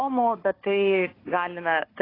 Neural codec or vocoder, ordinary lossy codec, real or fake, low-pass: codec, 16 kHz, 4 kbps, FunCodec, trained on Chinese and English, 50 frames a second; AAC, 24 kbps; fake; 5.4 kHz